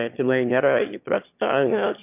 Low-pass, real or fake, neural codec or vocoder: 3.6 kHz; fake; autoencoder, 22.05 kHz, a latent of 192 numbers a frame, VITS, trained on one speaker